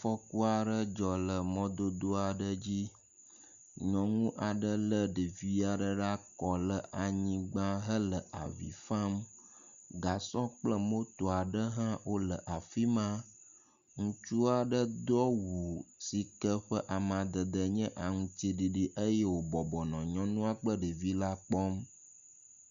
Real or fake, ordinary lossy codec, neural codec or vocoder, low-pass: real; Opus, 64 kbps; none; 7.2 kHz